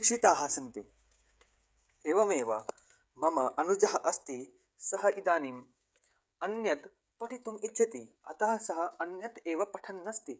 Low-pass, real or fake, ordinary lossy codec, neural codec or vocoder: none; fake; none; codec, 16 kHz, 16 kbps, FreqCodec, smaller model